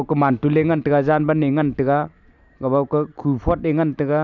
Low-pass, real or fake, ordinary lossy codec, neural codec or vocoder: 7.2 kHz; real; none; none